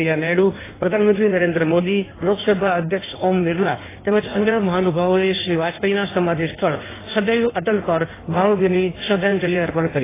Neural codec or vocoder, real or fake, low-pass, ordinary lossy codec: codec, 16 kHz in and 24 kHz out, 1.1 kbps, FireRedTTS-2 codec; fake; 3.6 kHz; AAC, 16 kbps